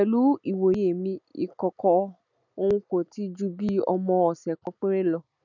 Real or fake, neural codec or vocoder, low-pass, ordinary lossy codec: real; none; 7.2 kHz; none